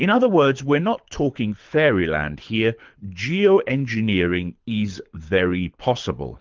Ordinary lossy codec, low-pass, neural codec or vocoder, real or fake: Opus, 32 kbps; 7.2 kHz; codec, 24 kHz, 6 kbps, HILCodec; fake